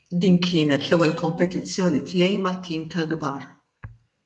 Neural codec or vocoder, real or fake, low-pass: codec, 32 kHz, 1.9 kbps, SNAC; fake; 10.8 kHz